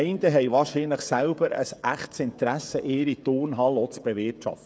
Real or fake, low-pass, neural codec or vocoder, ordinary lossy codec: fake; none; codec, 16 kHz, 16 kbps, FreqCodec, smaller model; none